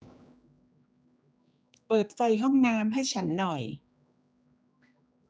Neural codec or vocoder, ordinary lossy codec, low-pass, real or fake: codec, 16 kHz, 2 kbps, X-Codec, HuBERT features, trained on general audio; none; none; fake